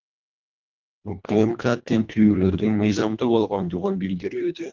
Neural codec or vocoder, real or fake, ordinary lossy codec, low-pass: codec, 24 kHz, 1.5 kbps, HILCodec; fake; Opus, 24 kbps; 7.2 kHz